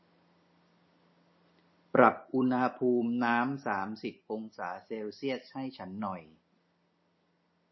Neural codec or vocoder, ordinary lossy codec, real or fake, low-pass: none; MP3, 24 kbps; real; 7.2 kHz